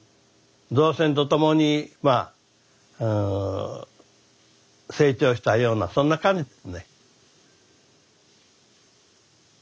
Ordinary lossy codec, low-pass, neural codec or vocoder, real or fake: none; none; none; real